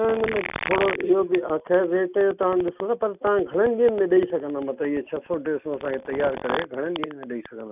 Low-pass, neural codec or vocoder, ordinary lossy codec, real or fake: 3.6 kHz; none; none; real